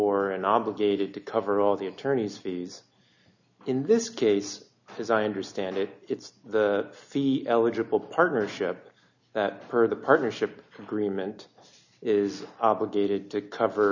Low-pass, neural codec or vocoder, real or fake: 7.2 kHz; none; real